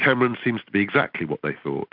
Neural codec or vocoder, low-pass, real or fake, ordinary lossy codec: none; 5.4 kHz; real; AAC, 48 kbps